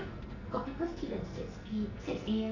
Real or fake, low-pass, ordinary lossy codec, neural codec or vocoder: fake; 7.2 kHz; none; codec, 44.1 kHz, 2.6 kbps, SNAC